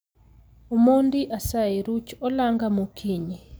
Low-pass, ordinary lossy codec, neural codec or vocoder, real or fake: none; none; none; real